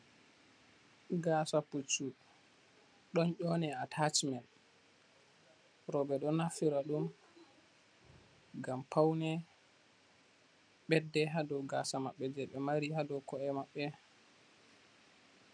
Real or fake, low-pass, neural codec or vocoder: real; 9.9 kHz; none